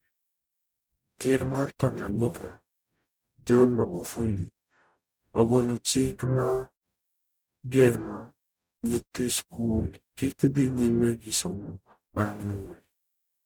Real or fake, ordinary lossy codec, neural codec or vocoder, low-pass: fake; none; codec, 44.1 kHz, 0.9 kbps, DAC; none